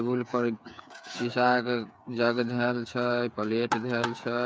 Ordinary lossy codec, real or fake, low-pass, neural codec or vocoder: none; fake; none; codec, 16 kHz, 8 kbps, FreqCodec, smaller model